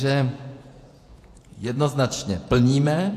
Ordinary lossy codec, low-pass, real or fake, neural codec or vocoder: AAC, 64 kbps; 14.4 kHz; fake; vocoder, 48 kHz, 128 mel bands, Vocos